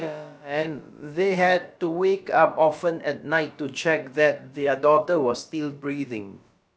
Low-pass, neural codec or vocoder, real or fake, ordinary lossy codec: none; codec, 16 kHz, about 1 kbps, DyCAST, with the encoder's durations; fake; none